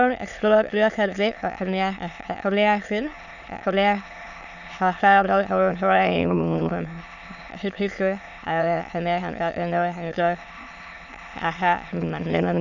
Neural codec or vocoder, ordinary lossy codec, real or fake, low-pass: autoencoder, 22.05 kHz, a latent of 192 numbers a frame, VITS, trained on many speakers; none; fake; 7.2 kHz